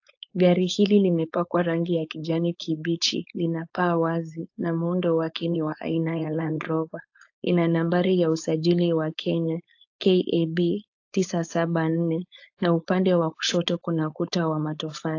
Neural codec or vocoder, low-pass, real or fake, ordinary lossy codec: codec, 16 kHz, 4.8 kbps, FACodec; 7.2 kHz; fake; AAC, 48 kbps